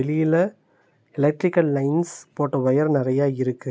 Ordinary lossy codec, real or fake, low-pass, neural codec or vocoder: none; real; none; none